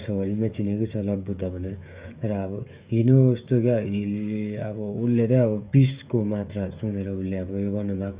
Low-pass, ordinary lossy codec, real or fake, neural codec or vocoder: 3.6 kHz; Opus, 64 kbps; fake; codec, 16 kHz, 16 kbps, FreqCodec, smaller model